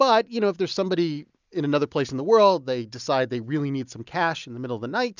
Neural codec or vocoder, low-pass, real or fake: none; 7.2 kHz; real